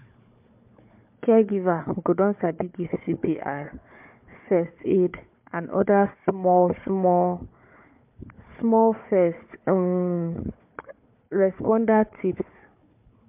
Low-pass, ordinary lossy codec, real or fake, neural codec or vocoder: 3.6 kHz; MP3, 32 kbps; fake; codec, 16 kHz, 4 kbps, FunCodec, trained on Chinese and English, 50 frames a second